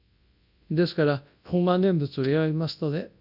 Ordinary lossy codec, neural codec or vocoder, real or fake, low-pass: AAC, 48 kbps; codec, 24 kHz, 0.9 kbps, WavTokenizer, large speech release; fake; 5.4 kHz